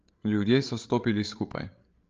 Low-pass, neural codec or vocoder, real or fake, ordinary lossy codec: 7.2 kHz; codec, 16 kHz, 8 kbps, FreqCodec, larger model; fake; Opus, 24 kbps